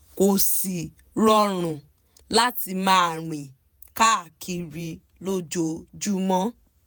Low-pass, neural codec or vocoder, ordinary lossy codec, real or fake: none; vocoder, 48 kHz, 128 mel bands, Vocos; none; fake